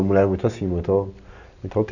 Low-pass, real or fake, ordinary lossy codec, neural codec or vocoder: 7.2 kHz; real; none; none